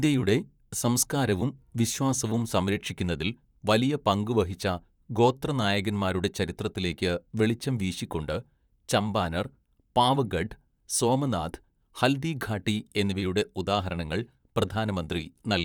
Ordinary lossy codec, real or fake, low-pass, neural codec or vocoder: none; fake; 19.8 kHz; vocoder, 44.1 kHz, 128 mel bands, Pupu-Vocoder